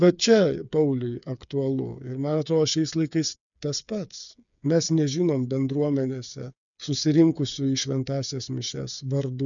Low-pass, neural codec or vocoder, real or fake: 7.2 kHz; codec, 16 kHz, 8 kbps, FreqCodec, smaller model; fake